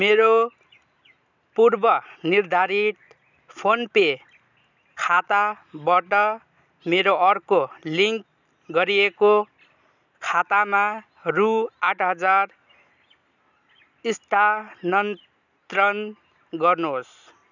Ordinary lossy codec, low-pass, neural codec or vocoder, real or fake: none; 7.2 kHz; none; real